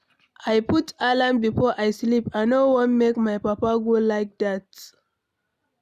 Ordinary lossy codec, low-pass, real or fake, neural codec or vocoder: none; 14.4 kHz; real; none